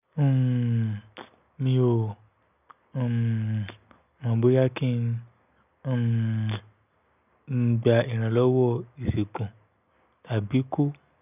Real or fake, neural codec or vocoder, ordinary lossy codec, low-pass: real; none; none; 3.6 kHz